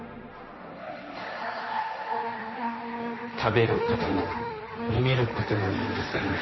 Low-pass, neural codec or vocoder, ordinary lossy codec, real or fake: 7.2 kHz; codec, 16 kHz, 1.1 kbps, Voila-Tokenizer; MP3, 24 kbps; fake